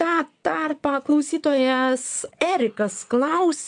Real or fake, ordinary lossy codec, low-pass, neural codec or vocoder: fake; MP3, 64 kbps; 9.9 kHz; vocoder, 22.05 kHz, 80 mel bands, WaveNeXt